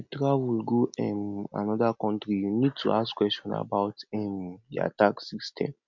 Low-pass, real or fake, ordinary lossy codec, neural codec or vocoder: 7.2 kHz; real; none; none